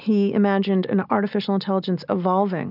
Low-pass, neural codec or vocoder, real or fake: 5.4 kHz; none; real